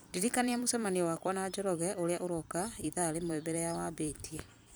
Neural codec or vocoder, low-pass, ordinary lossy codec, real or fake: vocoder, 44.1 kHz, 128 mel bands every 256 samples, BigVGAN v2; none; none; fake